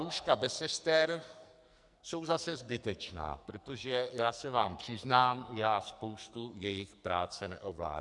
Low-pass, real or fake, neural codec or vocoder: 10.8 kHz; fake; codec, 44.1 kHz, 2.6 kbps, SNAC